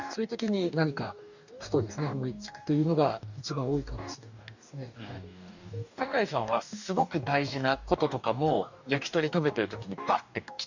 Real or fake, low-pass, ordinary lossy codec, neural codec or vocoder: fake; 7.2 kHz; none; codec, 44.1 kHz, 2.6 kbps, DAC